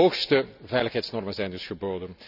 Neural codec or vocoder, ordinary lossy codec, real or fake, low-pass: none; none; real; 5.4 kHz